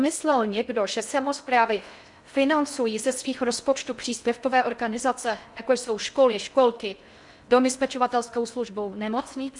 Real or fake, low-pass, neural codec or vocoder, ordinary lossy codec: fake; 10.8 kHz; codec, 16 kHz in and 24 kHz out, 0.6 kbps, FocalCodec, streaming, 4096 codes; MP3, 96 kbps